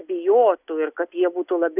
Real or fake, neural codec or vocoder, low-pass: real; none; 3.6 kHz